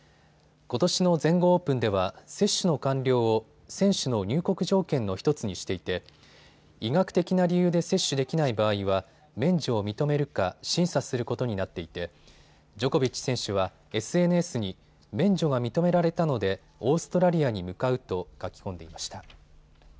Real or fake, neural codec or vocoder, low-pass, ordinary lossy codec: real; none; none; none